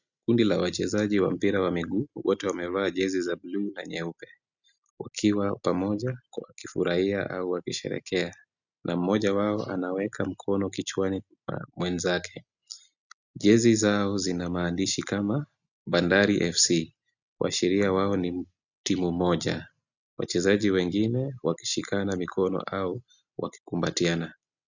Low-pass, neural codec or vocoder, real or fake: 7.2 kHz; none; real